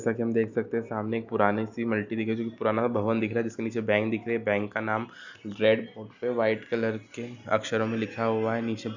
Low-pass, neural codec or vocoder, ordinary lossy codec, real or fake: 7.2 kHz; none; none; real